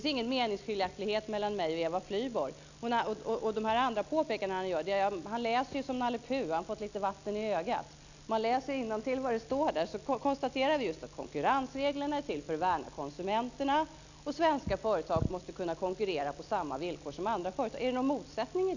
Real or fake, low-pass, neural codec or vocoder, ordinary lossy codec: real; 7.2 kHz; none; none